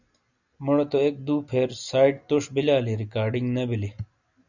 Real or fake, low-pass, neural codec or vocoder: real; 7.2 kHz; none